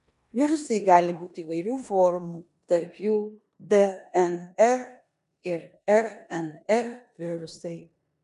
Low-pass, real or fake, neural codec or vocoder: 10.8 kHz; fake; codec, 16 kHz in and 24 kHz out, 0.9 kbps, LongCat-Audio-Codec, four codebook decoder